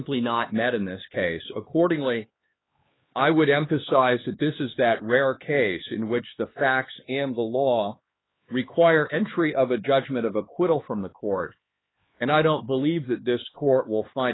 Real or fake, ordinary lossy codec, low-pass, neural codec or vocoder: fake; AAC, 16 kbps; 7.2 kHz; codec, 16 kHz, 4 kbps, X-Codec, HuBERT features, trained on LibriSpeech